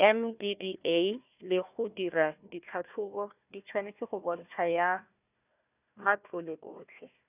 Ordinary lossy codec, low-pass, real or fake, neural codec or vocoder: none; 3.6 kHz; fake; codec, 16 kHz, 1 kbps, FunCodec, trained on Chinese and English, 50 frames a second